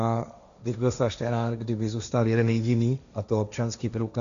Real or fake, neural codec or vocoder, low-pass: fake; codec, 16 kHz, 1.1 kbps, Voila-Tokenizer; 7.2 kHz